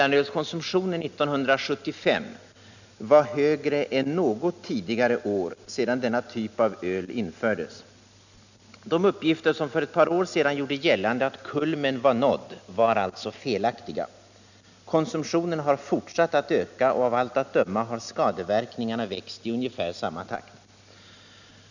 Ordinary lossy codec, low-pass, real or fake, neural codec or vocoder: none; 7.2 kHz; real; none